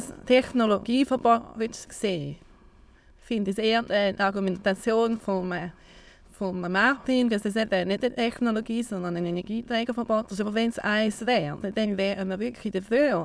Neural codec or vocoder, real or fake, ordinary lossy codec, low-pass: autoencoder, 22.05 kHz, a latent of 192 numbers a frame, VITS, trained on many speakers; fake; none; none